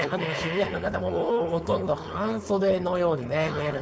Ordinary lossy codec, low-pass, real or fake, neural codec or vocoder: none; none; fake; codec, 16 kHz, 4.8 kbps, FACodec